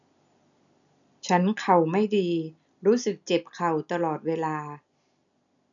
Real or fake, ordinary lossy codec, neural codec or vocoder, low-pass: real; none; none; 7.2 kHz